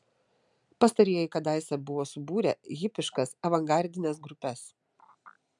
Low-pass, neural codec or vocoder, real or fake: 10.8 kHz; none; real